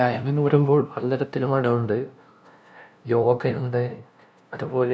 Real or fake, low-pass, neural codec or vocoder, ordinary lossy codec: fake; none; codec, 16 kHz, 0.5 kbps, FunCodec, trained on LibriTTS, 25 frames a second; none